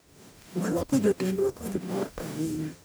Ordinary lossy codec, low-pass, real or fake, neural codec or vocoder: none; none; fake; codec, 44.1 kHz, 0.9 kbps, DAC